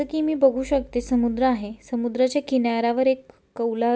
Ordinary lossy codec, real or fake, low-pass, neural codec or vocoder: none; real; none; none